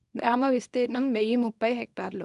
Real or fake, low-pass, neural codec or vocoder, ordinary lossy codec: fake; 10.8 kHz; codec, 24 kHz, 0.9 kbps, WavTokenizer, small release; none